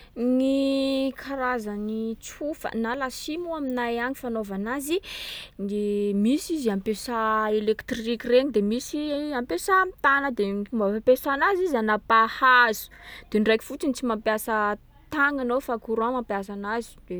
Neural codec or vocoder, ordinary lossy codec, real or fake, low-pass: none; none; real; none